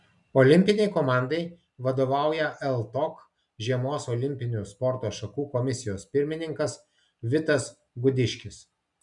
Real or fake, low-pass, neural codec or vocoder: real; 10.8 kHz; none